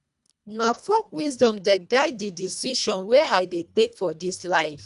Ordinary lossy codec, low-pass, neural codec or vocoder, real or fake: none; 10.8 kHz; codec, 24 kHz, 1.5 kbps, HILCodec; fake